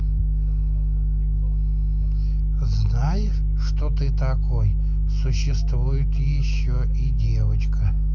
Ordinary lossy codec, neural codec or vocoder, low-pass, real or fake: none; none; 7.2 kHz; real